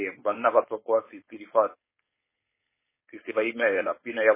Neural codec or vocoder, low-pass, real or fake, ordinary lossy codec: codec, 16 kHz, 4.8 kbps, FACodec; 3.6 kHz; fake; MP3, 16 kbps